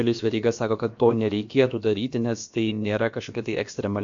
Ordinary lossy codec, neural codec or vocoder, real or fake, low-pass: MP3, 48 kbps; codec, 16 kHz, about 1 kbps, DyCAST, with the encoder's durations; fake; 7.2 kHz